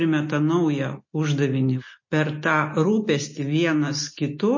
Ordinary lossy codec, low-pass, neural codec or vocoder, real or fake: MP3, 32 kbps; 7.2 kHz; none; real